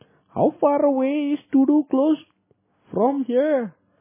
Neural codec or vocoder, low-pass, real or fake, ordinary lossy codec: none; 3.6 kHz; real; MP3, 16 kbps